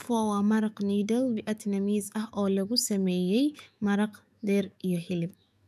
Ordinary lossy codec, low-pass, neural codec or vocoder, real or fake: none; 14.4 kHz; autoencoder, 48 kHz, 128 numbers a frame, DAC-VAE, trained on Japanese speech; fake